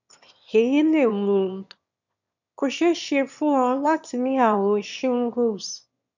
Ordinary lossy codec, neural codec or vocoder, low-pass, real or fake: none; autoencoder, 22.05 kHz, a latent of 192 numbers a frame, VITS, trained on one speaker; 7.2 kHz; fake